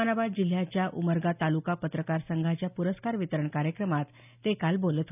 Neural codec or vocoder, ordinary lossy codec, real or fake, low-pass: none; none; real; 3.6 kHz